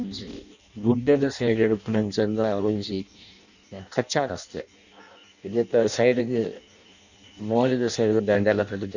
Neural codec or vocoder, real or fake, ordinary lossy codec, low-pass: codec, 16 kHz in and 24 kHz out, 0.6 kbps, FireRedTTS-2 codec; fake; none; 7.2 kHz